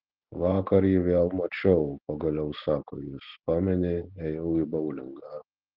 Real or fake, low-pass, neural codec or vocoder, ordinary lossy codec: real; 5.4 kHz; none; Opus, 16 kbps